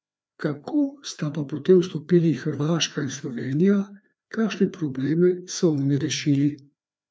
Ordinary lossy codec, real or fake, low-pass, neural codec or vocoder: none; fake; none; codec, 16 kHz, 2 kbps, FreqCodec, larger model